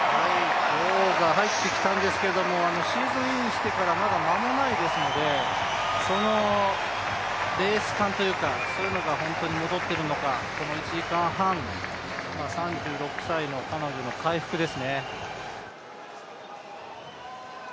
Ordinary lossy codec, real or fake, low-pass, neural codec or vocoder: none; real; none; none